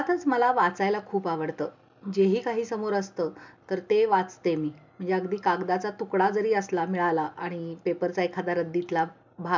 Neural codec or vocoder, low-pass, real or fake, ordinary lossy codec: none; 7.2 kHz; real; MP3, 64 kbps